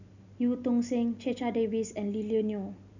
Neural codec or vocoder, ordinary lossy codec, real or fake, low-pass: none; none; real; 7.2 kHz